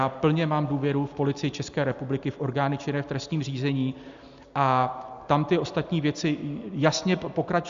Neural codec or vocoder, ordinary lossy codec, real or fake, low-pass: none; Opus, 64 kbps; real; 7.2 kHz